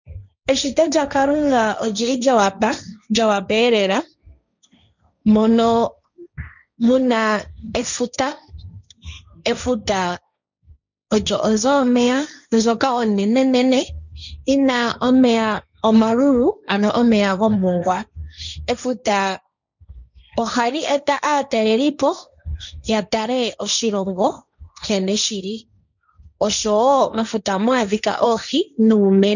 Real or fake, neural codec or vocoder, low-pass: fake; codec, 16 kHz, 1.1 kbps, Voila-Tokenizer; 7.2 kHz